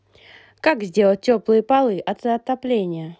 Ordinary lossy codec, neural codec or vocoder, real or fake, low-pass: none; none; real; none